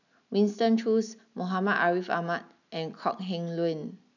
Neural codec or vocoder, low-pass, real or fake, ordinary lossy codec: none; 7.2 kHz; real; none